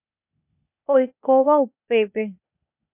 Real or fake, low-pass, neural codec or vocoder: fake; 3.6 kHz; codec, 16 kHz, 0.8 kbps, ZipCodec